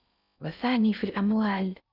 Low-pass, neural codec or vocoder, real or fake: 5.4 kHz; codec, 16 kHz in and 24 kHz out, 0.6 kbps, FocalCodec, streaming, 4096 codes; fake